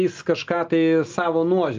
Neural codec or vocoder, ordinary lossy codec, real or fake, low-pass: none; Opus, 24 kbps; real; 7.2 kHz